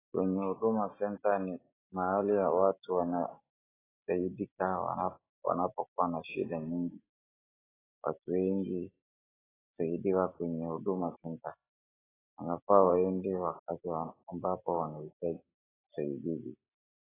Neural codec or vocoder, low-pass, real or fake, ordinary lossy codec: none; 3.6 kHz; real; AAC, 16 kbps